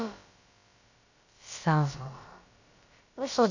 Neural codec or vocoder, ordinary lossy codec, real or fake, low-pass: codec, 16 kHz, about 1 kbps, DyCAST, with the encoder's durations; none; fake; 7.2 kHz